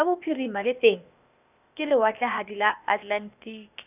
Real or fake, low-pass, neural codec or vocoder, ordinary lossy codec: fake; 3.6 kHz; codec, 16 kHz, 0.8 kbps, ZipCodec; none